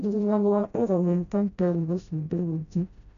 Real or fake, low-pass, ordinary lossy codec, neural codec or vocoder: fake; 7.2 kHz; none; codec, 16 kHz, 0.5 kbps, FreqCodec, smaller model